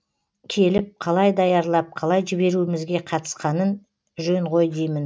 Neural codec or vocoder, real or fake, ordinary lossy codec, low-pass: none; real; none; none